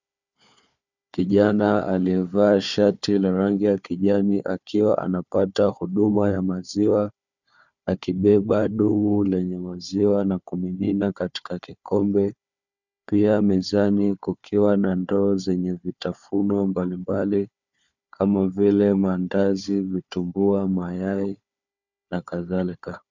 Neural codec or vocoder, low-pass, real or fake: codec, 16 kHz, 4 kbps, FunCodec, trained on Chinese and English, 50 frames a second; 7.2 kHz; fake